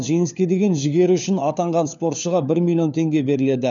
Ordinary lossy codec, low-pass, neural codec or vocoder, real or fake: none; 7.2 kHz; codec, 16 kHz, 4 kbps, FunCodec, trained on LibriTTS, 50 frames a second; fake